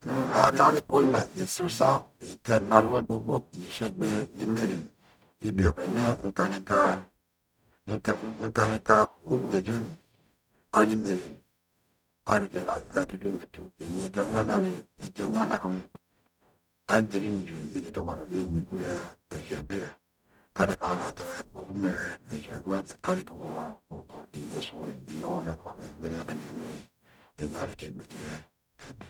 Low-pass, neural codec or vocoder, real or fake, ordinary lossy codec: 19.8 kHz; codec, 44.1 kHz, 0.9 kbps, DAC; fake; none